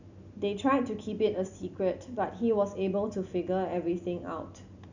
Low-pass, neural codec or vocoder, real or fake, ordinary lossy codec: 7.2 kHz; none; real; none